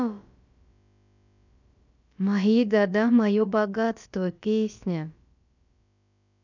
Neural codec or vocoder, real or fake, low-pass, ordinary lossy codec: codec, 16 kHz, about 1 kbps, DyCAST, with the encoder's durations; fake; 7.2 kHz; none